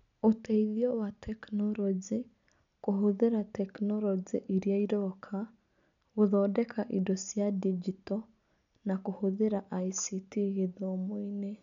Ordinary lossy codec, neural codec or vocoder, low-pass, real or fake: none; none; 7.2 kHz; real